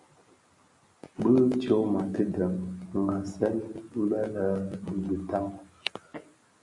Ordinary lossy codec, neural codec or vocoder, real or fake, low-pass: MP3, 64 kbps; none; real; 10.8 kHz